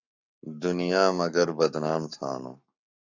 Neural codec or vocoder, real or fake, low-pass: codec, 44.1 kHz, 7.8 kbps, Pupu-Codec; fake; 7.2 kHz